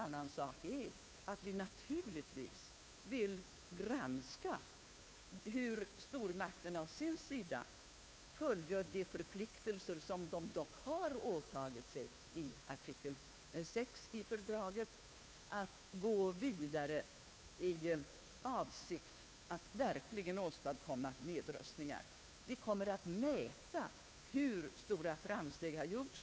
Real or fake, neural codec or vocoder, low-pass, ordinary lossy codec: fake; codec, 16 kHz, 2 kbps, FunCodec, trained on Chinese and English, 25 frames a second; none; none